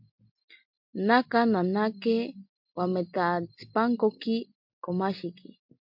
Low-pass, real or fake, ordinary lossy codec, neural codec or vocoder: 5.4 kHz; real; MP3, 48 kbps; none